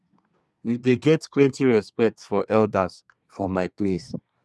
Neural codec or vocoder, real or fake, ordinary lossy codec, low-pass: codec, 24 kHz, 1 kbps, SNAC; fake; none; none